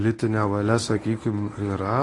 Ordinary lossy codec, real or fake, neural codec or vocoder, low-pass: AAC, 32 kbps; fake; codec, 24 kHz, 0.9 kbps, WavTokenizer, medium speech release version 1; 10.8 kHz